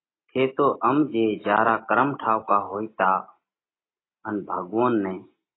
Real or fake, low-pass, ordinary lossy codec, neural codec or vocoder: real; 7.2 kHz; AAC, 16 kbps; none